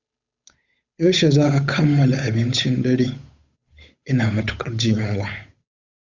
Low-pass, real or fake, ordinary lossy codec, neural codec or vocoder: 7.2 kHz; fake; Opus, 64 kbps; codec, 16 kHz, 8 kbps, FunCodec, trained on Chinese and English, 25 frames a second